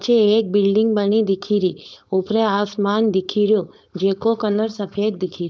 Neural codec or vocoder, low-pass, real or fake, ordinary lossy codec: codec, 16 kHz, 4.8 kbps, FACodec; none; fake; none